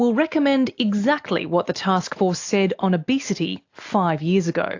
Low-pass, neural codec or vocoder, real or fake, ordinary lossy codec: 7.2 kHz; none; real; AAC, 48 kbps